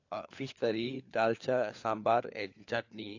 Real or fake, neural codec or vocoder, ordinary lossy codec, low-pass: fake; codec, 16 kHz, 4 kbps, FunCodec, trained on LibriTTS, 50 frames a second; AAC, 48 kbps; 7.2 kHz